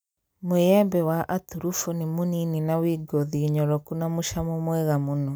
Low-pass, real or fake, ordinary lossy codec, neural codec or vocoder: none; real; none; none